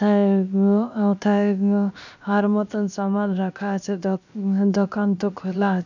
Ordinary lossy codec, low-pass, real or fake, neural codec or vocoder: none; 7.2 kHz; fake; codec, 16 kHz, 0.7 kbps, FocalCodec